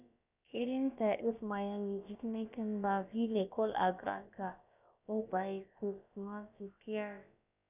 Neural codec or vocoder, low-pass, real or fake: codec, 16 kHz, about 1 kbps, DyCAST, with the encoder's durations; 3.6 kHz; fake